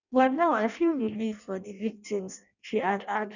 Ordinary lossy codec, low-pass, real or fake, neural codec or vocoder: none; 7.2 kHz; fake; codec, 16 kHz in and 24 kHz out, 0.6 kbps, FireRedTTS-2 codec